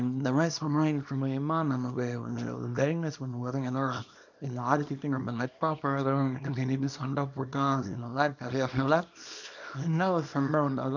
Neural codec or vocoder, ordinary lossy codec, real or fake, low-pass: codec, 24 kHz, 0.9 kbps, WavTokenizer, small release; none; fake; 7.2 kHz